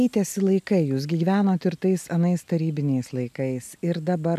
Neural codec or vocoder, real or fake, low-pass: none; real; 14.4 kHz